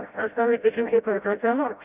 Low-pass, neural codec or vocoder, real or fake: 3.6 kHz; codec, 16 kHz, 0.5 kbps, FreqCodec, smaller model; fake